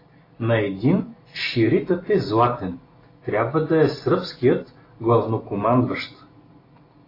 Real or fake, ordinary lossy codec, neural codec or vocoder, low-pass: real; AAC, 24 kbps; none; 5.4 kHz